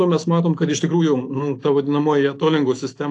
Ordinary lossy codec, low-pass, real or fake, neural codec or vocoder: AAC, 48 kbps; 10.8 kHz; fake; autoencoder, 48 kHz, 128 numbers a frame, DAC-VAE, trained on Japanese speech